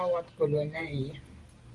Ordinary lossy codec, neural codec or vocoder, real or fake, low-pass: Opus, 24 kbps; vocoder, 44.1 kHz, 128 mel bands every 512 samples, BigVGAN v2; fake; 10.8 kHz